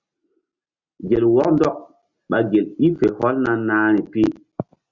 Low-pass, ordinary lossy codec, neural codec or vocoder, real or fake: 7.2 kHz; Opus, 64 kbps; none; real